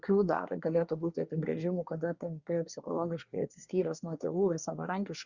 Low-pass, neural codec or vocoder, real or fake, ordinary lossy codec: 7.2 kHz; codec, 24 kHz, 1 kbps, SNAC; fake; Opus, 64 kbps